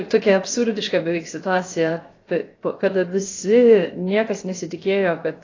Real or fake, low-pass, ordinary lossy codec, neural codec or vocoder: fake; 7.2 kHz; AAC, 32 kbps; codec, 16 kHz, 0.7 kbps, FocalCodec